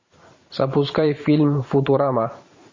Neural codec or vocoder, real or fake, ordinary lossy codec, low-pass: vocoder, 44.1 kHz, 128 mel bands every 512 samples, BigVGAN v2; fake; MP3, 32 kbps; 7.2 kHz